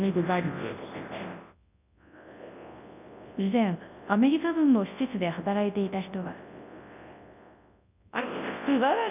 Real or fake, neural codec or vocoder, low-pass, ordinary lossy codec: fake; codec, 24 kHz, 0.9 kbps, WavTokenizer, large speech release; 3.6 kHz; none